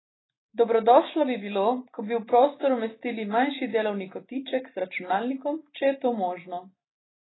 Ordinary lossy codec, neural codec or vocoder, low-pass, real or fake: AAC, 16 kbps; none; 7.2 kHz; real